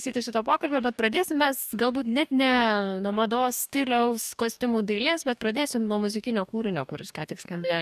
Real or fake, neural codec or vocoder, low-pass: fake; codec, 44.1 kHz, 2.6 kbps, DAC; 14.4 kHz